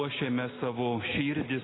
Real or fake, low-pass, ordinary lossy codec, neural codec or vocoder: real; 7.2 kHz; AAC, 16 kbps; none